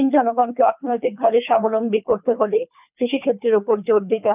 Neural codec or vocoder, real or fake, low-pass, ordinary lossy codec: codec, 24 kHz, 3 kbps, HILCodec; fake; 3.6 kHz; none